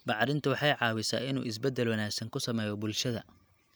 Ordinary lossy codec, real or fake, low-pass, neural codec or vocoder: none; real; none; none